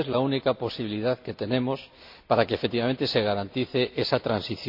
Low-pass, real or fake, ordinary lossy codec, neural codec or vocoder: 5.4 kHz; real; MP3, 48 kbps; none